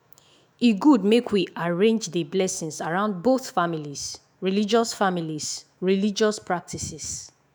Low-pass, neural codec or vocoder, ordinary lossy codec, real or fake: none; autoencoder, 48 kHz, 128 numbers a frame, DAC-VAE, trained on Japanese speech; none; fake